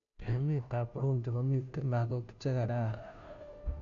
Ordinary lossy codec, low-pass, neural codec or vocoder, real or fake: none; 7.2 kHz; codec, 16 kHz, 0.5 kbps, FunCodec, trained on Chinese and English, 25 frames a second; fake